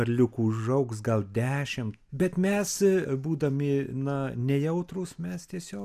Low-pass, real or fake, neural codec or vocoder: 14.4 kHz; real; none